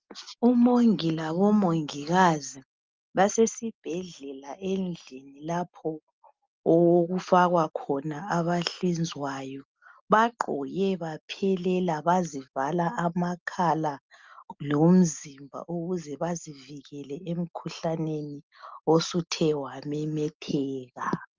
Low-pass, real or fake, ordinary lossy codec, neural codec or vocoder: 7.2 kHz; real; Opus, 32 kbps; none